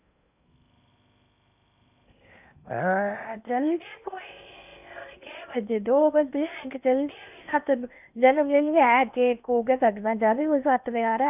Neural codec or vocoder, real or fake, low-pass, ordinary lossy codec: codec, 16 kHz in and 24 kHz out, 0.8 kbps, FocalCodec, streaming, 65536 codes; fake; 3.6 kHz; none